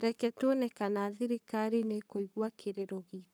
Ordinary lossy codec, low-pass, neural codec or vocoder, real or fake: none; none; codec, 44.1 kHz, 7.8 kbps, Pupu-Codec; fake